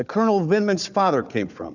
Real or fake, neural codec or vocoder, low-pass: fake; codec, 16 kHz, 4 kbps, FunCodec, trained on Chinese and English, 50 frames a second; 7.2 kHz